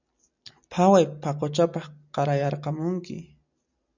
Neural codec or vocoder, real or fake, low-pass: none; real; 7.2 kHz